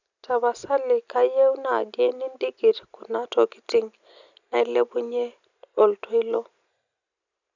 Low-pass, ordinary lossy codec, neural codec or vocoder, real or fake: 7.2 kHz; none; none; real